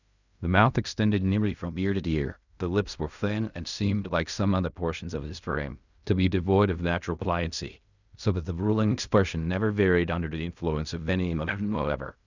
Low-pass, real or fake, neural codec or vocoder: 7.2 kHz; fake; codec, 16 kHz in and 24 kHz out, 0.4 kbps, LongCat-Audio-Codec, fine tuned four codebook decoder